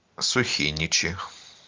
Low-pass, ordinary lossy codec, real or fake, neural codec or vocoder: 7.2 kHz; Opus, 32 kbps; real; none